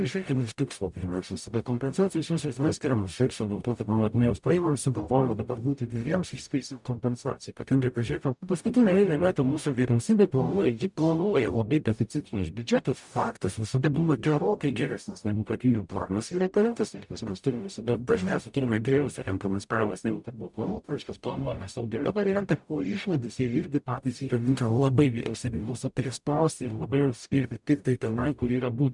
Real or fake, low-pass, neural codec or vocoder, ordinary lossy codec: fake; 10.8 kHz; codec, 44.1 kHz, 0.9 kbps, DAC; MP3, 96 kbps